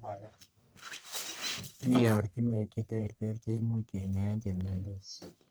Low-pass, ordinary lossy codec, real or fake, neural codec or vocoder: none; none; fake; codec, 44.1 kHz, 1.7 kbps, Pupu-Codec